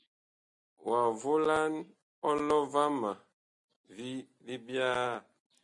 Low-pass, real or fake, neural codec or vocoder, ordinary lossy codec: 10.8 kHz; real; none; MP3, 48 kbps